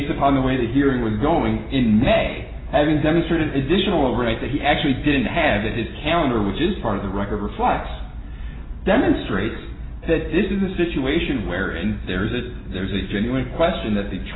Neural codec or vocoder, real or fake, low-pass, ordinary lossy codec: none; real; 7.2 kHz; AAC, 16 kbps